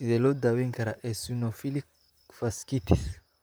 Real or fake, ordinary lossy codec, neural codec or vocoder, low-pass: fake; none; vocoder, 44.1 kHz, 128 mel bands, Pupu-Vocoder; none